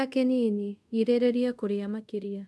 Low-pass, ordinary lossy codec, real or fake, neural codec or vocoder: none; none; fake; codec, 24 kHz, 0.5 kbps, DualCodec